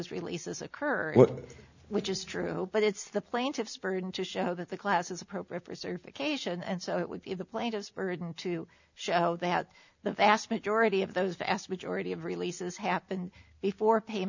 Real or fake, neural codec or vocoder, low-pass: real; none; 7.2 kHz